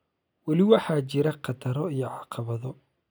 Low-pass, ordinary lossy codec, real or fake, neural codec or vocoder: none; none; real; none